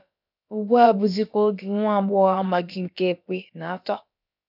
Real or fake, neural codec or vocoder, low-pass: fake; codec, 16 kHz, about 1 kbps, DyCAST, with the encoder's durations; 5.4 kHz